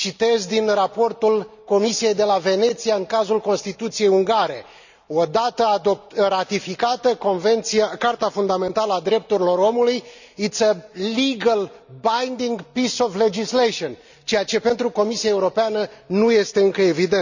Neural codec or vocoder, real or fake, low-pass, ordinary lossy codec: none; real; 7.2 kHz; none